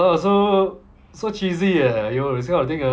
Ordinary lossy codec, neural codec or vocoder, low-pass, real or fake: none; none; none; real